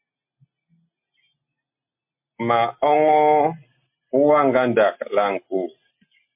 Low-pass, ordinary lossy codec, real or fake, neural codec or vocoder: 3.6 kHz; MP3, 32 kbps; real; none